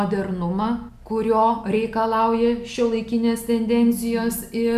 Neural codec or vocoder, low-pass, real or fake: none; 14.4 kHz; real